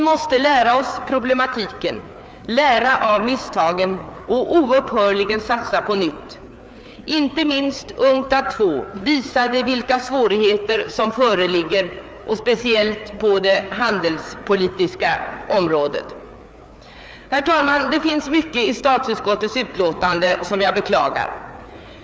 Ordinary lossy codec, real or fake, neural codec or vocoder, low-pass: none; fake; codec, 16 kHz, 4 kbps, FreqCodec, larger model; none